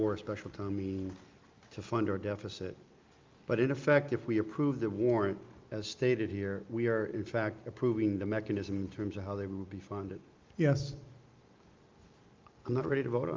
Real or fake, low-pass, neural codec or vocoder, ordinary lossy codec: real; 7.2 kHz; none; Opus, 24 kbps